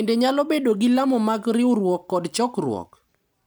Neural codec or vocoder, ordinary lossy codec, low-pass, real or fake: none; none; none; real